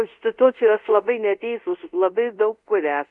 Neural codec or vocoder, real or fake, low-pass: codec, 24 kHz, 0.5 kbps, DualCodec; fake; 10.8 kHz